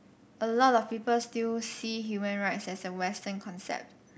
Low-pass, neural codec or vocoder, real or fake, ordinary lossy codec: none; none; real; none